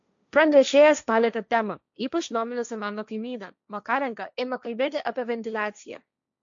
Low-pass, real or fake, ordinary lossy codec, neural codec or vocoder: 7.2 kHz; fake; MP3, 64 kbps; codec, 16 kHz, 1.1 kbps, Voila-Tokenizer